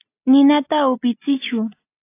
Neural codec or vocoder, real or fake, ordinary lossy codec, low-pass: none; real; AAC, 24 kbps; 3.6 kHz